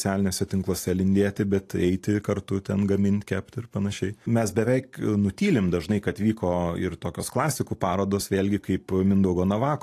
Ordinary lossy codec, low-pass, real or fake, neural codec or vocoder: AAC, 64 kbps; 14.4 kHz; fake; vocoder, 44.1 kHz, 128 mel bands every 256 samples, BigVGAN v2